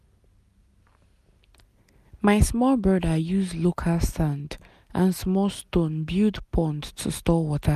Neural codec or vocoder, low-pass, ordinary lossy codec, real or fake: none; 14.4 kHz; none; real